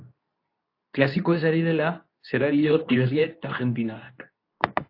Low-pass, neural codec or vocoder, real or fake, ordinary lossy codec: 5.4 kHz; codec, 24 kHz, 0.9 kbps, WavTokenizer, medium speech release version 2; fake; AAC, 32 kbps